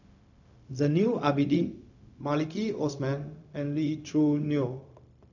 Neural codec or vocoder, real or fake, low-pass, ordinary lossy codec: codec, 16 kHz, 0.4 kbps, LongCat-Audio-Codec; fake; 7.2 kHz; none